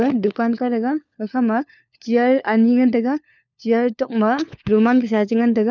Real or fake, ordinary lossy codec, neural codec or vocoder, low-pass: fake; none; codec, 16 kHz, 4 kbps, FunCodec, trained on LibriTTS, 50 frames a second; 7.2 kHz